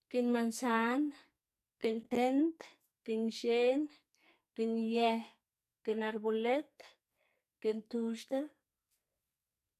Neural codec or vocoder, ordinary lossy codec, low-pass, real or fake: codec, 44.1 kHz, 2.6 kbps, SNAC; none; 14.4 kHz; fake